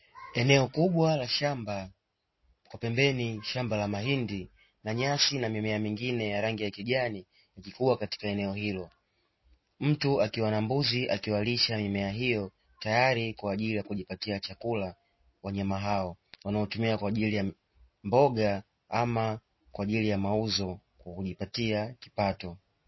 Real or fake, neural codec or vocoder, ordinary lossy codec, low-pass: real; none; MP3, 24 kbps; 7.2 kHz